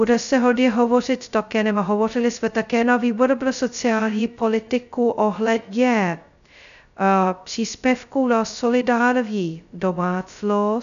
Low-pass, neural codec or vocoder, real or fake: 7.2 kHz; codec, 16 kHz, 0.2 kbps, FocalCodec; fake